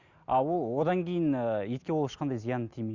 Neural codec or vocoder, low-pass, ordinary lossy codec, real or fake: none; 7.2 kHz; Opus, 64 kbps; real